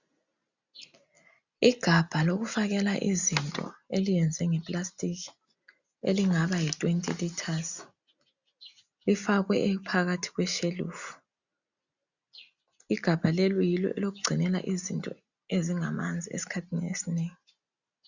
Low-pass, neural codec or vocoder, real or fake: 7.2 kHz; none; real